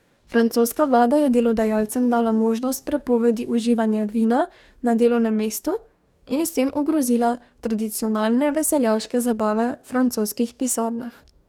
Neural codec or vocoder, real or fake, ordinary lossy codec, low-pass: codec, 44.1 kHz, 2.6 kbps, DAC; fake; none; 19.8 kHz